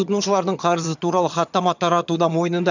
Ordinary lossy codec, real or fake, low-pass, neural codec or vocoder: none; fake; 7.2 kHz; vocoder, 22.05 kHz, 80 mel bands, HiFi-GAN